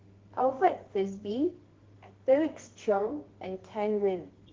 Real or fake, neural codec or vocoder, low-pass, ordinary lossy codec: fake; codec, 24 kHz, 0.9 kbps, WavTokenizer, medium music audio release; 7.2 kHz; Opus, 16 kbps